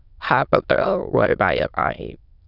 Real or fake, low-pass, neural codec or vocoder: fake; 5.4 kHz; autoencoder, 22.05 kHz, a latent of 192 numbers a frame, VITS, trained on many speakers